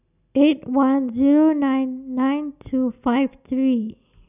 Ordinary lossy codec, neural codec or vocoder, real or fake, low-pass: none; none; real; 3.6 kHz